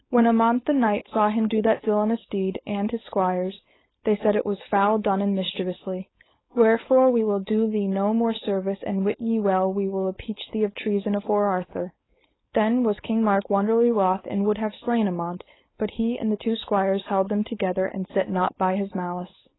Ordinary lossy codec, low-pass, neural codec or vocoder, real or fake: AAC, 16 kbps; 7.2 kHz; codec, 16 kHz, 4.8 kbps, FACodec; fake